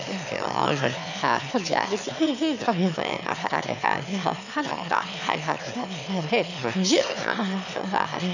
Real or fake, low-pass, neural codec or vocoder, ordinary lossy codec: fake; 7.2 kHz; autoencoder, 22.05 kHz, a latent of 192 numbers a frame, VITS, trained on one speaker; none